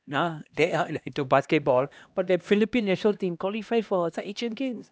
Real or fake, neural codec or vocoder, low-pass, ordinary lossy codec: fake; codec, 16 kHz, 1 kbps, X-Codec, HuBERT features, trained on LibriSpeech; none; none